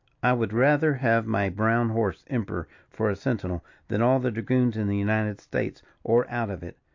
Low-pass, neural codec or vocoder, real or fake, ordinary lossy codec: 7.2 kHz; none; real; AAC, 48 kbps